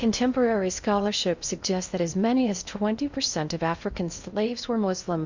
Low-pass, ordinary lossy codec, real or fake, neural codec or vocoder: 7.2 kHz; Opus, 64 kbps; fake; codec, 16 kHz in and 24 kHz out, 0.6 kbps, FocalCodec, streaming, 2048 codes